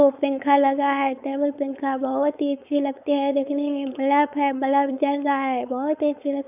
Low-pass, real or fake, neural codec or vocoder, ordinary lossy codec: 3.6 kHz; fake; codec, 16 kHz, 4.8 kbps, FACodec; none